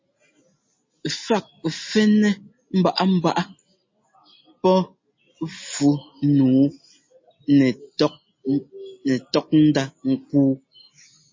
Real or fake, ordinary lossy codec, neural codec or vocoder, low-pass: real; MP3, 32 kbps; none; 7.2 kHz